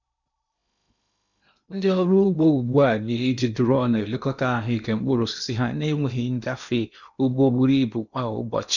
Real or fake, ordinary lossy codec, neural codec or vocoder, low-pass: fake; none; codec, 16 kHz in and 24 kHz out, 0.8 kbps, FocalCodec, streaming, 65536 codes; 7.2 kHz